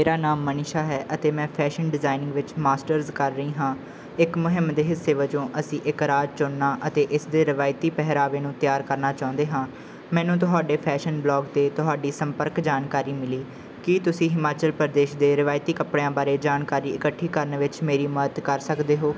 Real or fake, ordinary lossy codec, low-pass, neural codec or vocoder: real; none; none; none